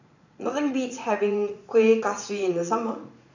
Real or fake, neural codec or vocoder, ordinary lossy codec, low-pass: fake; vocoder, 44.1 kHz, 80 mel bands, Vocos; none; 7.2 kHz